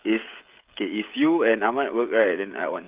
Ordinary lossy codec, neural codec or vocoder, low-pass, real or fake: Opus, 24 kbps; codec, 16 kHz, 16 kbps, FreqCodec, smaller model; 3.6 kHz; fake